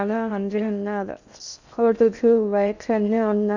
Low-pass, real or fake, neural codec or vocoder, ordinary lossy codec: 7.2 kHz; fake; codec, 16 kHz in and 24 kHz out, 0.6 kbps, FocalCodec, streaming, 2048 codes; none